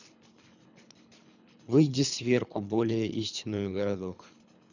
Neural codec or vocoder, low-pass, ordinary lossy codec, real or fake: codec, 24 kHz, 3 kbps, HILCodec; 7.2 kHz; none; fake